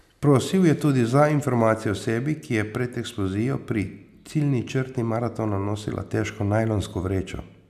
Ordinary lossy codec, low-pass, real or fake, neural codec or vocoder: none; 14.4 kHz; real; none